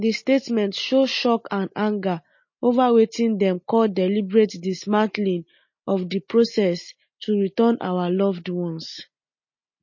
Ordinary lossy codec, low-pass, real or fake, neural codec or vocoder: MP3, 32 kbps; 7.2 kHz; real; none